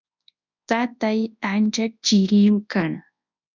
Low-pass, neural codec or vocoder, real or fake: 7.2 kHz; codec, 24 kHz, 0.9 kbps, WavTokenizer, large speech release; fake